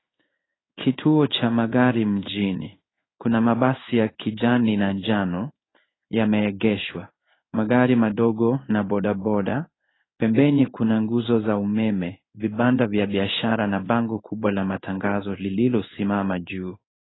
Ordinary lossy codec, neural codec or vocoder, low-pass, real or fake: AAC, 16 kbps; codec, 16 kHz in and 24 kHz out, 1 kbps, XY-Tokenizer; 7.2 kHz; fake